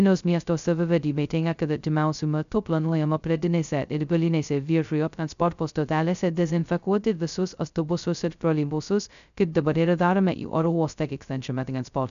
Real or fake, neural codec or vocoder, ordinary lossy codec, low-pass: fake; codec, 16 kHz, 0.2 kbps, FocalCodec; MP3, 96 kbps; 7.2 kHz